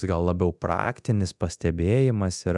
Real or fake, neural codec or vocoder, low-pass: fake; codec, 24 kHz, 0.9 kbps, DualCodec; 10.8 kHz